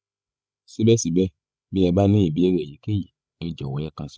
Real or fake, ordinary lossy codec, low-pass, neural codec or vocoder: fake; none; none; codec, 16 kHz, 16 kbps, FreqCodec, larger model